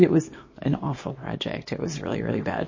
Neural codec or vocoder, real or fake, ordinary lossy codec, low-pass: codec, 24 kHz, 0.9 kbps, WavTokenizer, small release; fake; MP3, 32 kbps; 7.2 kHz